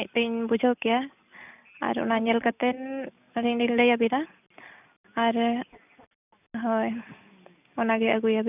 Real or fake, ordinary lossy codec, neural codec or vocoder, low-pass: real; none; none; 3.6 kHz